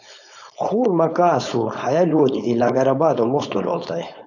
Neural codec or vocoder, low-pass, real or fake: codec, 16 kHz, 4.8 kbps, FACodec; 7.2 kHz; fake